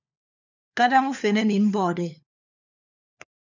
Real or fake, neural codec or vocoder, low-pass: fake; codec, 16 kHz, 4 kbps, FunCodec, trained on LibriTTS, 50 frames a second; 7.2 kHz